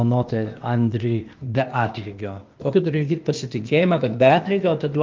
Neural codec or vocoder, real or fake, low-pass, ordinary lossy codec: codec, 16 kHz, 0.8 kbps, ZipCodec; fake; 7.2 kHz; Opus, 32 kbps